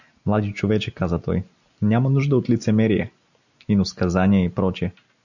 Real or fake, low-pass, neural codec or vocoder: real; 7.2 kHz; none